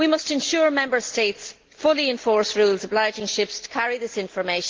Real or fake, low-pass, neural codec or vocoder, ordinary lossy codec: real; 7.2 kHz; none; Opus, 16 kbps